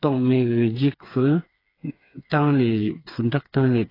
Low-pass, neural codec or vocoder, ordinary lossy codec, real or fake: 5.4 kHz; codec, 16 kHz, 4 kbps, FreqCodec, smaller model; AAC, 24 kbps; fake